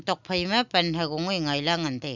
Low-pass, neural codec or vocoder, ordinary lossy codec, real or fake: 7.2 kHz; none; none; real